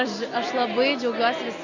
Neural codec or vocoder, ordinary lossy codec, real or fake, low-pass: none; Opus, 64 kbps; real; 7.2 kHz